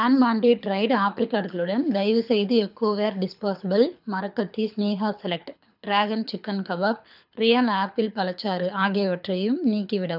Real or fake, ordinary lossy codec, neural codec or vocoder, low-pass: fake; none; codec, 24 kHz, 6 kbps, HILCodec; 5.4 kHz